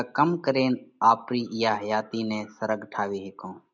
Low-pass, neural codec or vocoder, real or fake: 7.2 kHz; none; real